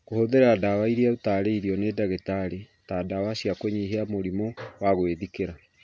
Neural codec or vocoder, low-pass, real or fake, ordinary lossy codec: none; none; real; none